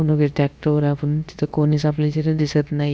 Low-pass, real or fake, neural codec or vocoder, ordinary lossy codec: none; fake; codec, 16 kHz, about 1 kbps, DyCAST, with the encoder's durations; none